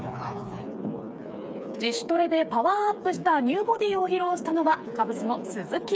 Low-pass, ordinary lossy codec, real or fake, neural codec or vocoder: none; none; fake; codec, 16 kHz, 4 kbps, FreqCodec, smaller model